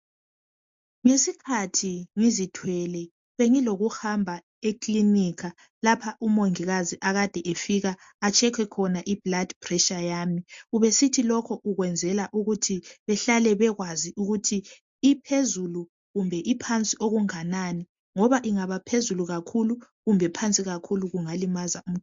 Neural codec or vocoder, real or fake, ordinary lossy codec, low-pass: none; real; MP3, 48 kbps; 7.2 kHz